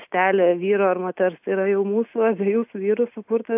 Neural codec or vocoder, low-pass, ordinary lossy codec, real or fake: none; 3.6 kHz; AAC, 32 kbps; real